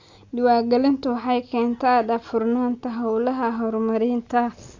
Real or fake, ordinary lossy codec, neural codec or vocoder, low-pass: real; AAC, 48 kbps; none; 7.2 kHz